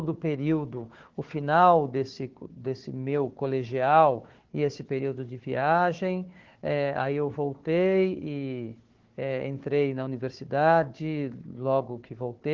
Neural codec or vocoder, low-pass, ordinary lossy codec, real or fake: codec, 16 kHz, 4 kbps, FunCodec, trained on Chinese and English, 50 frames a second; 7.2 kHz; Opus, 16 kbps; fake